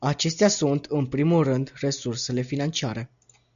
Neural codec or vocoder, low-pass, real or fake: none; 7.2 kHz; real